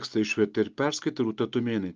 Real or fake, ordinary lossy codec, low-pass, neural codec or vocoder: real; Opus, 24 kbps; 7.2 kHz; none